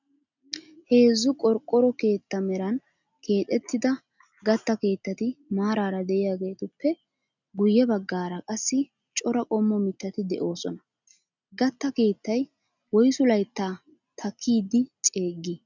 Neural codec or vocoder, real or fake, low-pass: none; real; 7.2 kHz